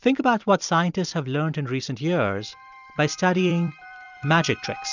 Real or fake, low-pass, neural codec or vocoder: fake; 7.2 kHz; vocoder, 44.1 kHz, 128 mel bands every 256 samples, BigVGAN v2